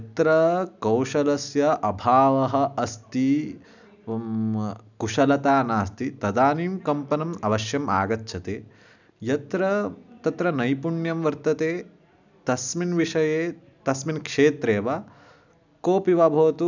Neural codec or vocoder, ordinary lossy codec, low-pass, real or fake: none; none; 7.2 kHz; real